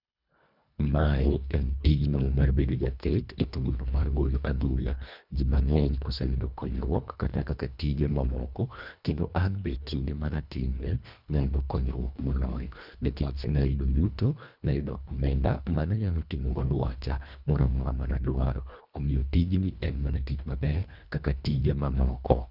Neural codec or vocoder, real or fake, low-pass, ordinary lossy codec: codec, 24 kHz, 1.5 kbps, HILCodec; fake; 5.4 kHz; none